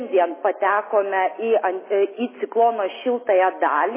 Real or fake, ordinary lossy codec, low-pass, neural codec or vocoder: real; MP3, 16 kbps; 3.6 kHz; none